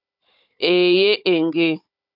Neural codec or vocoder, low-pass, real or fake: codec, 16 kHz, 16 kbps, FunCodec, trained on Chinese and English, 50 frames a second; 5.4 kHz; fake